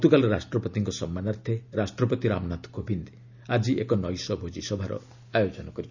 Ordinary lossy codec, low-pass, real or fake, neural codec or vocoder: none; 7.2 kHz; real; none